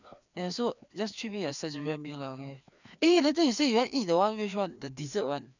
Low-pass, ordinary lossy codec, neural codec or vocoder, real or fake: 7.2 kHz; none; codec, 16 kHz, 2 kbps, FreqCodec, larger model; fake